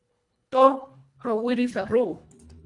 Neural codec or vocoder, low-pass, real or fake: codec, 24 kHz, 1.5 kbps, HILCodec; 10.8 kHz; fake